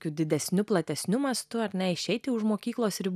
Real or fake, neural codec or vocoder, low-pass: real; none; 14.4 kHz